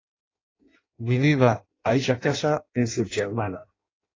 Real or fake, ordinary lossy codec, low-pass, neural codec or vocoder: fake; AAC, 32 kbps; 7.2 kHz; codec, 16 kHz in and 24 kHz out, 0.6 kbps, FireRedTTS-2 codec